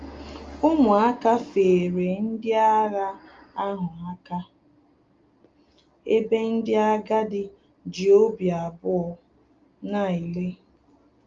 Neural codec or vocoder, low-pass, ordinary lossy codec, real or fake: none; 7.2 kHz; Opus, 32 kbps; real